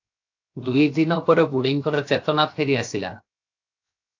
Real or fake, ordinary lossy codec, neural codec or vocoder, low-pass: fake; AAC, 48 kbps; codec, 16 kHz, 0.7 kbps, FocalCodec; 7.2 kHz